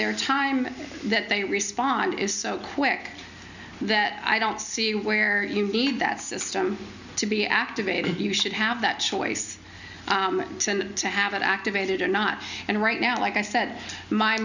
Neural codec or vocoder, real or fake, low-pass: none; real; 7.2 kHz